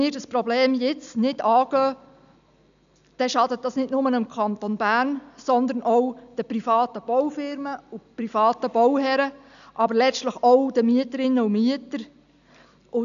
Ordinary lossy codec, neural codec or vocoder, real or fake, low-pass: none; none; real; 7.2 kHz